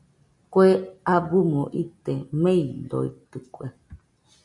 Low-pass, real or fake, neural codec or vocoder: 10.8 kHz; real; none